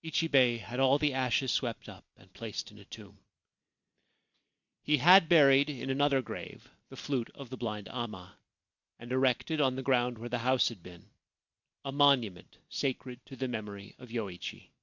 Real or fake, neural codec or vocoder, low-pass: real; none; 7.2 kHz